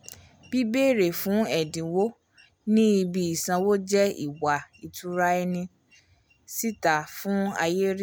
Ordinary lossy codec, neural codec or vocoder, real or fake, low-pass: none; none; real; none